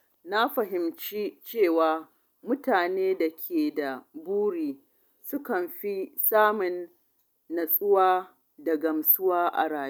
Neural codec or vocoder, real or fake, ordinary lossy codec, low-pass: none; real; none; none